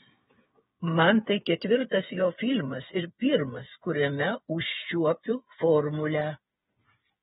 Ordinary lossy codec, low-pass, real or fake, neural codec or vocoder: AAC, 16 kbps; 7.2 kHz; fake; codec, 16 kHz, 8 kbps, FreqCodec, smaller model